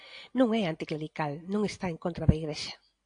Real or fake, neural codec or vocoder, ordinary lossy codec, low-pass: real; none; AAC, 48 kbps; 9.9 kHz